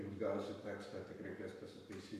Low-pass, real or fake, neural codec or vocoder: 14.4 kHz; fake; codec, 44.1 kHz, 7.8 kbps, Pupu-Codec